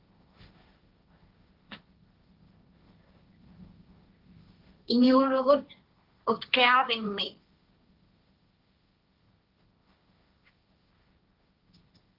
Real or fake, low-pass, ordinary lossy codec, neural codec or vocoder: fake; 5.4 kHz; Opus, 32 kbps; codec, 16 kHz, 1.1 kbps, Voila-Tokenizer